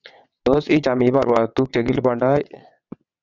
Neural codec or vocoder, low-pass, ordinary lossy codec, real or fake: vocoder, 22.05 kHz, 80 mel bands, WaveNeXt; 7.2 kHz; Opus, 64 kbps; fake